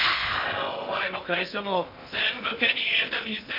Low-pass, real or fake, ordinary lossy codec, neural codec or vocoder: 5.4 kHz; fake; MP3, 48 kbps; codec, 16 kHz in and 24 kHz out, 0.6 kbps, FocalCodec, streaming, 2048 codes